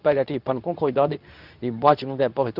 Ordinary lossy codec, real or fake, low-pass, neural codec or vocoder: none; fake; 5.4 kHz; codec, 24 kHz, 0.9 kbps, WavTokenizer, medium speech release version 2